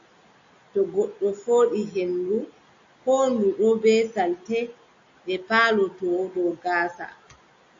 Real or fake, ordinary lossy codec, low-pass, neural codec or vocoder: real; AAC, 48 kbps; 7.2 kHz; none